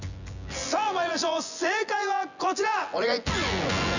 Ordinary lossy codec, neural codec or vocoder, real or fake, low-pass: none; vocoder, 24 kHz, 100 mel bands, Vocos; fake; 7.2 kHz